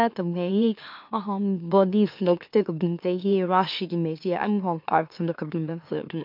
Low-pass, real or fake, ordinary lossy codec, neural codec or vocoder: 5.4 kHz; fake; MP3, 48 kbps; autoencoder, 44.1 kHz, a latent of 192 numbers a frame, MeloTTS